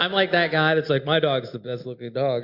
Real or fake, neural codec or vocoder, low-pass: real; none; 5.4 kHz